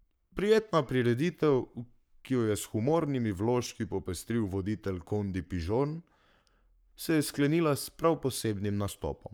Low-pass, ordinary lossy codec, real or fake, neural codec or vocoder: none; none; fake; codec, 44.1 kHz, 7.8 kbps, Pupu-Codec